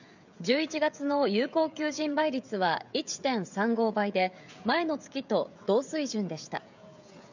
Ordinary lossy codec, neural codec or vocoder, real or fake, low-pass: none; codec, 16 kHz, 16 kbps, FreqCodec, smaller model; fake; 7.2 kHz